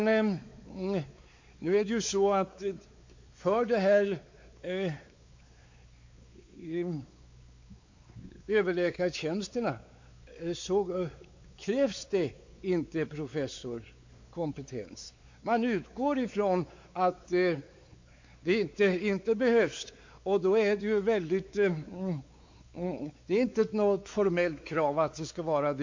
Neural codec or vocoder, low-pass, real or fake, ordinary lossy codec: codec, 16 kHz, 4 kbps, X-Codec, WavLM features, trained on Multilingual LibriSpeech; 7.2 kHz; fake; MP3, 48 kbps